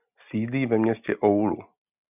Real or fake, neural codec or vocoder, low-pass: real; none; 3.6 kHz